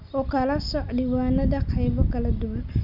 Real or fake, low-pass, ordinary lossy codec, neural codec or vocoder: real; 5.4 kHz; none; none